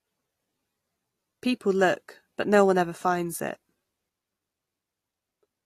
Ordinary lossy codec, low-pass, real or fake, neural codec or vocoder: AAC, 48 kbps; 14.4 kHz; real; none